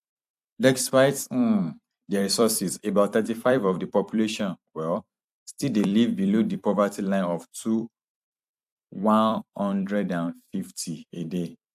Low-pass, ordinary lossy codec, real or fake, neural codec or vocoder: 14.4 kHz; AAC, 96 kbps; fake; vocoder, 44.1 kHz, 128 mel bands every 256 samples, BigVGAN v2